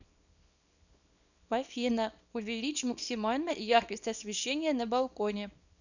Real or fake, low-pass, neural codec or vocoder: fake; 7.2 kHz; codec, 24 kHz, 0.9 kbps, WavTokenizer, small release